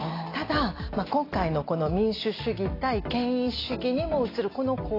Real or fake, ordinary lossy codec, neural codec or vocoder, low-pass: real; AAC, 24 kbps; none; 5.4 kHz